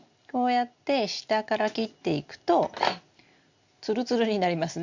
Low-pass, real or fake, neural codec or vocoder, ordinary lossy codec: 7.2 kHz; real; none; Opus, 64 kbps